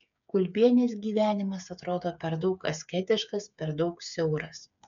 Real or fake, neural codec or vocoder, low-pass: fake; codec, 16 kHz, 8 kbps, FreqCodec, smaller model; 7.2 kHz